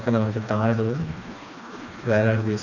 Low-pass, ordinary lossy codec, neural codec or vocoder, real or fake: 7.2 kHz; none; codec, 16 kHz, 2 kbps, FreqCodec, smaller model; fake